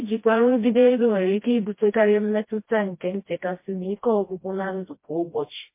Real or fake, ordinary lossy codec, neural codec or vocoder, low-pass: fake; MP3, 24 kbps; codec, 16 kHz, 1 kbps, FreqCodec, smaller model; 3.6 kHz